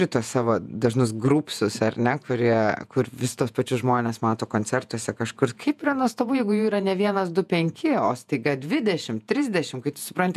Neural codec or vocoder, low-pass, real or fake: vocoder, 48 kHz, 128 mel bands, Vocos; 14.4 kHz; fake